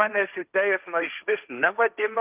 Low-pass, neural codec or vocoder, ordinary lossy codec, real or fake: 3.6 kHz; codec, 16 kHz, 1.1 kbps, Voila-Tokenizer; Opus, 24 kbps; fake